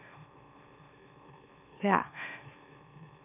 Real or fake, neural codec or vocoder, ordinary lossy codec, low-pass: fake; autoencoder, 44.1 kHz, a latent of 192 numbers a frame, MeloTTS; none; 3.6 kHz